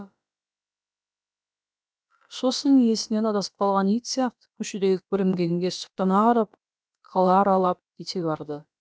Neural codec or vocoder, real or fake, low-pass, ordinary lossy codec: codec, 16 kHz, about 1 kbps, DyCAST, with the encoder's durations; fake; none; none